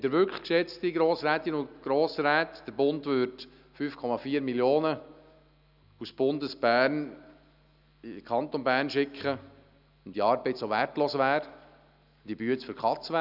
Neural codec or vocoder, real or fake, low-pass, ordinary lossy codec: none; real; 5.4 kHz; none